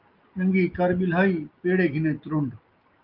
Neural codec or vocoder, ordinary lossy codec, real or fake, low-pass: none; Opus, 32 kbps; real; 5.4 kHz